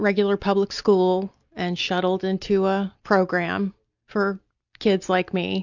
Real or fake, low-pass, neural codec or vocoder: real; 7.2 kHz; none